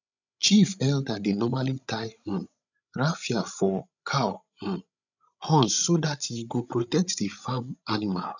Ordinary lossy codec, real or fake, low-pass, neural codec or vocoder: none; fake; 7.2 kHz; codec, 16 kHz, 16 kbps, FreqCodec, larger model